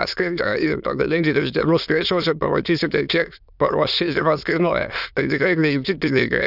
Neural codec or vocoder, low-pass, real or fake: autoencoder, 22.05 kHz, a latent of 192 numbers a frame, VITS, trained on many speakers; 5.4 kHz; fake